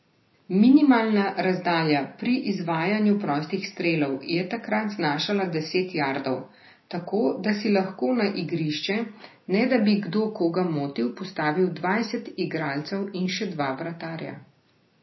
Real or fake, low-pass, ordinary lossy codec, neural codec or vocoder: real; 7.2 kHz; MP3, 24 kbps; none